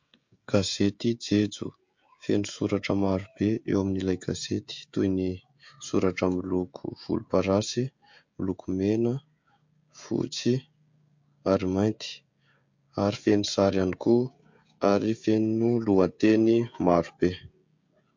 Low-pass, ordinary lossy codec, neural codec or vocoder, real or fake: 7.2 kHz; MP3, 48 kbps; codec, 16 kHz, 16 kbps, FreqCodec, smaller model; fake